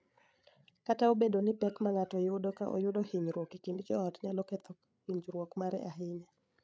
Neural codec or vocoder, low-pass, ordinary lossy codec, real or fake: codec, 16 kHz, 8 kbps, FreqCodec, larger model; none; none; fake